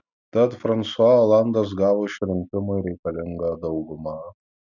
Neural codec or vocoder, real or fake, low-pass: none; real; 7.2 kHz